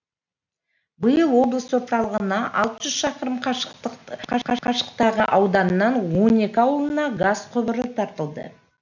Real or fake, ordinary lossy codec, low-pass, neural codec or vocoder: real; none; 7.2 kHz; none